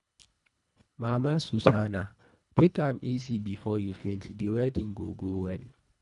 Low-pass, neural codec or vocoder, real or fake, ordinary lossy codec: 10.8 kHz; codec, 24 kHz, 1.5 kbps, HILCodec; fake; none